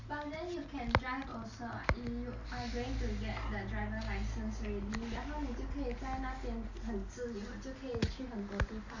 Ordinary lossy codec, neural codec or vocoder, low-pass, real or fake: Opus, 64 kbps; none; 7.2 kHz; real